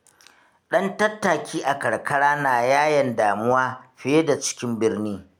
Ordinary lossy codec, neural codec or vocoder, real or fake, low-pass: none; none; real; 19.8 kHz